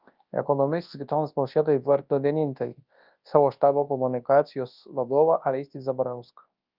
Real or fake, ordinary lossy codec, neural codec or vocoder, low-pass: fake; Opus, 32 kbps; codec, 24 kHz, 0.9 kbps, WavTokenizer, large speech release; 5.4 kHz